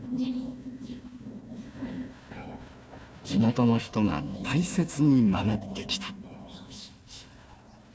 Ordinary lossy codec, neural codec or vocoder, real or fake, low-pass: none; codec, 16 kHz, 1 kbps, FunCodec, trained on Chinese and English, 50 frames a second; fake; none